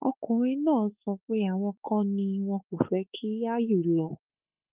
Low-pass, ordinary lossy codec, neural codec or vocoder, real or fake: 3.6 kHz; Opus, 32 kbps; autoencoder, 48 kHz, 32 numbers a frame, DAC-VAE, trained on Japanese speech; fake